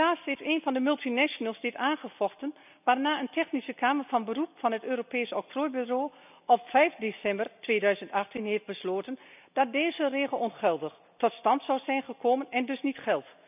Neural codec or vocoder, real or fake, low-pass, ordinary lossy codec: none; real; 3.6 kHz; none